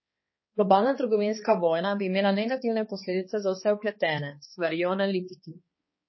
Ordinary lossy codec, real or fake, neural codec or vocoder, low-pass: MP3, 24 kbps; fake; codec, 16 kHz, 2 kbps, X-Codec, HuBERT features, trained on balanced general audio; 7.2 kHz